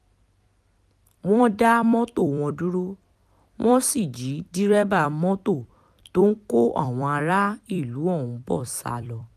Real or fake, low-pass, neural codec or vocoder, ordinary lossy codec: fake; 14.4 kHz; vocoder, 44.1 kHz, 128 mel bands every 256 samples, BigVGAN v2; none